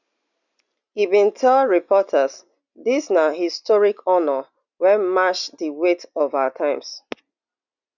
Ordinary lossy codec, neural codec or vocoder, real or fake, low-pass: none; none; real; 7.2 kHz